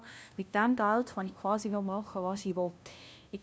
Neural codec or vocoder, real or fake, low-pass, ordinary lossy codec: codec, 16 kHz, 0.5 kbps, FunCodec, trained on LibriTTS, 25 frames a second; fake; none; none